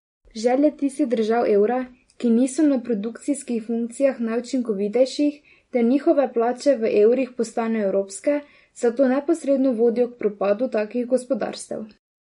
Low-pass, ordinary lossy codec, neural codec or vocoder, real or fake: 10.8 kHz; MP3, 48 kbps; none; real